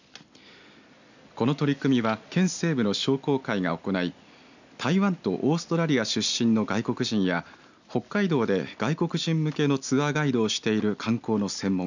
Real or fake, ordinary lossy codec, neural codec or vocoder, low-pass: real; none; none; 7.2 kHz